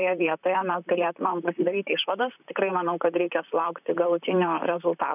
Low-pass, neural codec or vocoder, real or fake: 3.6 kHz; vocoder, 44.1 kHz, 128 mel bands, Pupu-Vocoder; fake